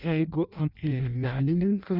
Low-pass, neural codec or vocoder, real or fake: 5.4 kHz; codec, 16 kHz in and 24 kHz out, 0.6 kbps, FireRedTTS-2 codec; fake